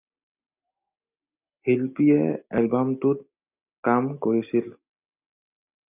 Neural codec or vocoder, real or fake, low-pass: none; real; 3.6 kHz